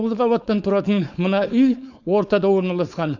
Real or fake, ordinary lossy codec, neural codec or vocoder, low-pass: fake; none; codec, 16 kHz, 4.8 kbps, FACodec; 7.2 kHz